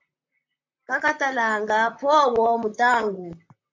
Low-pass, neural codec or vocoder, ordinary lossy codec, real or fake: 7.2 kHz; vocoder, 44.1 kHz, 128 mel bands, Pupu-Vocoder; MP3, 64 kbps; fake